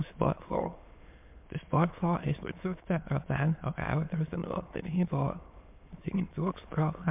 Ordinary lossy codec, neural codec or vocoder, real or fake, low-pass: MP3, 32 kbps; autoencoder, 22.05 kHz, a latent of 192 numbers a frame, VITS, trained on many speakers; fake; 3.6 kHz